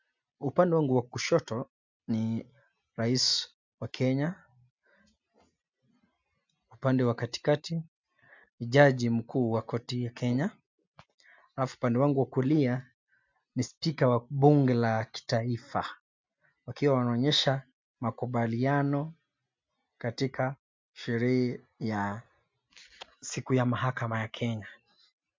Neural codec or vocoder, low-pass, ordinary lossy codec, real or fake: none; 7.2 kHz; MP3, 64 kbps; real